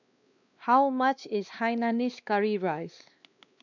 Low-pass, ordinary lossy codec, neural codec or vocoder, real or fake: 7.2 kHz; none; codec, 16 kHz, 2 kbps, X-Codec, WavLM features, trained on Multilingual LibriSpeech; fake